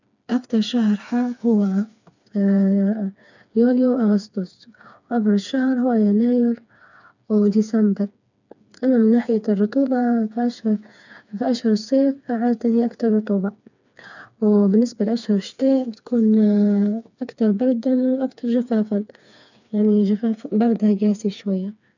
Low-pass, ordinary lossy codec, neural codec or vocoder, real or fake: 7.2 kHz; none; codec, 16 kHz, 4 kbps, FreqCodec, smaller model; fake